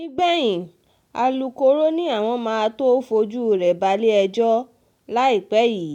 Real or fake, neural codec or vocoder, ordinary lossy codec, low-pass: real; none; none; 19.8 kHz